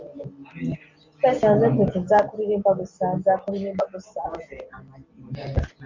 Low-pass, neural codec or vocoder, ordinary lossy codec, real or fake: 7.2 kHz; none; MP3, 48 kbps; real